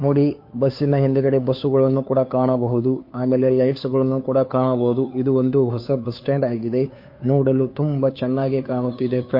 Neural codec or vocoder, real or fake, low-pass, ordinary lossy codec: codec, 16 kHz, 2 kbps, FunCodec, trained on Chinese and English, 25 frames a second; fake; 5.4 kHz; MP3, 32 kbps